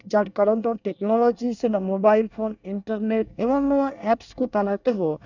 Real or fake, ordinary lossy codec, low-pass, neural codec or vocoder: fake; none; 7.2 kHz; codec, 24 kHz, 1 kbps, SNAC